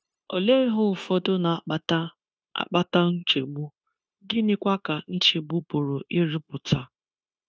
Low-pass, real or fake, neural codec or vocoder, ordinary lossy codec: none; fake; codec, 16 kHz, 0.9 kbps, LongCat-Audio-Codec; none